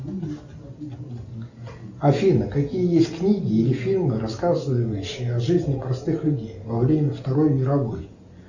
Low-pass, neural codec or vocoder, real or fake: 7.2 kHz; none; real